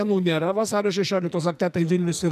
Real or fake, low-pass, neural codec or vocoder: fake; 14.4 kHz; codec, 44.1 kHz, 2.6 kbps, SNAC